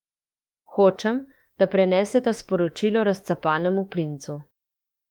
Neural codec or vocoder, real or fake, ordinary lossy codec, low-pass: autoencoder, 48 kHz, 32 numbers a frame, DAC-VAE, trained on Japanese speech; fake; Opus, 32 kbps; 19.8 kHz